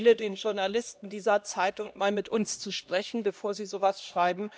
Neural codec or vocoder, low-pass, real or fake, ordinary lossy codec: codec, 16 kHz, 1 kbps, X-Codec, HuBERT features, trained on LibriSpeech; none; fake; none